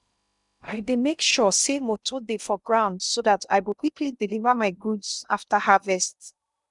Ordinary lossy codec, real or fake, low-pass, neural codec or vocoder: none; fake; 10.8 kHz; codec, 16 kHz in and 24 kHz out, 0.6 kbps, FocalCodec, streaming, 2048 codes